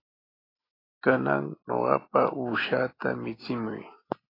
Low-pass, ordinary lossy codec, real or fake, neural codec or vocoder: 5.4 kHz; AAC, 24 kbps; real; none